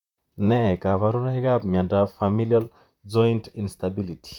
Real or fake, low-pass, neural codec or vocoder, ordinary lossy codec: fake; 19.8 kHz; vocoder, 44.1 kHz, 128 mel bands, Pupu-Vocoder; none